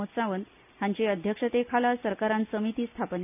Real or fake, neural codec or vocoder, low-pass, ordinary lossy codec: real; none; 3.6 kHz; none